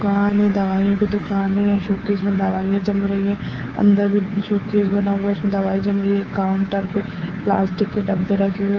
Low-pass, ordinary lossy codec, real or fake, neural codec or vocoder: 7.2 kHz; Opus, 24 kbps; fake; codec, 24 kHz, 3.1 kbps, DualCodec